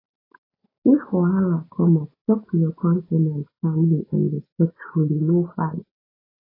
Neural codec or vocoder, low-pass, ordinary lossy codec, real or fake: none; 5.4 kHz; AAC, 24 kbps; real